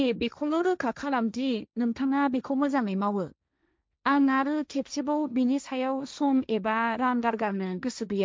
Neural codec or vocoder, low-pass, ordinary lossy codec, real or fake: codec, 16 kHz, 1.1 kbps, Voila-Tokenizer; none; none; fake